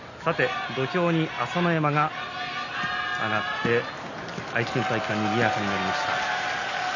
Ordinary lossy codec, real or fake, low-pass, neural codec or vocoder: none; real; 7.2 kHz; none